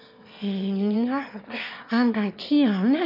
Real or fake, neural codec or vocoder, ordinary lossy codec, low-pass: fake; autoencoder, 22.05 kHz, a latent of 192 numbers a frame, VITS, trained on one speaker; none; 5.4 kHz